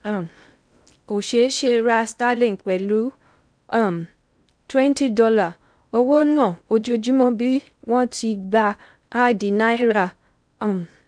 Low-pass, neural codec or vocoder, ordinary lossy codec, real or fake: 9.9 kHz; codec, 16 kHz in and 24 kHz out, 0.6 kbps, FocalCodec, streaming, 2048 codes; none; fake